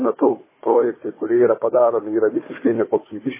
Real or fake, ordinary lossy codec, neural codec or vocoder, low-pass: fake; MP3, 16 kbps; codec, 16 kHz, 4 kbps, FunCodec, trained on Chinese and English, 50 frames a second; 3.6 kHz